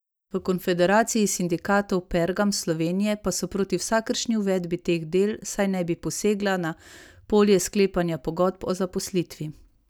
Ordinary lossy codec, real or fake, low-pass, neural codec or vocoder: none; real; none; none